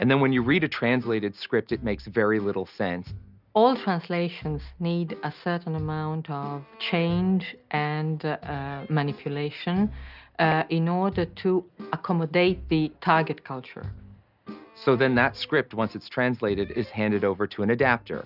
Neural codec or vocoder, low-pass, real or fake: none; 5.4 kHz; real